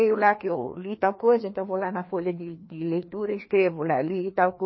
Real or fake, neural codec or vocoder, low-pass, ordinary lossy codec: fake; codec, 16 kHz, 2 kbps, FreqCodec, larger model; 7.2 kHz; MP3, 24 kbps